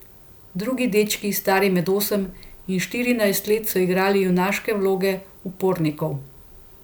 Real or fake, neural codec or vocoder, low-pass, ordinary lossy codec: real; none; none; none